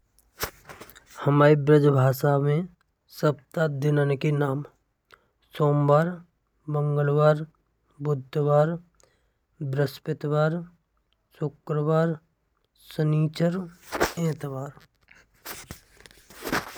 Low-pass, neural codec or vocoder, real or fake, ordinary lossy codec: none; none; real; none